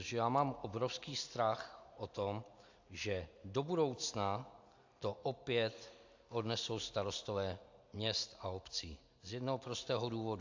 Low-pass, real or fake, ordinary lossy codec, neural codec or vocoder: 7.2 kHz; real; AAC, 48 kbps; none